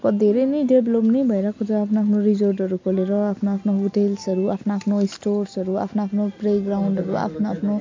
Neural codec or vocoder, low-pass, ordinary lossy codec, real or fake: none; 7.2 kHz; MP3, 48 kbps; real